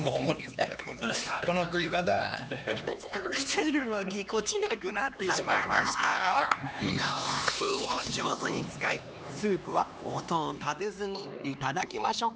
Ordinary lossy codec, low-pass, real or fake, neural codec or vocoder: none; none; fake; codec, 16 kHz, 2 kbps, X-Codec, HuBERT features, trained on LibriSpeech